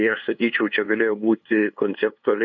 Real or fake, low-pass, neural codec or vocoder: fake; 7.2 kHz; codec, 16 kHz, 2 kbps, FunCodec, trained on LibriTTS, 25 frames a second